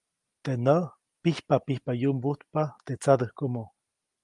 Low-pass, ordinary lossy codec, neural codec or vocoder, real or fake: 10.8 kHz; Opus, 32 kbps; none; real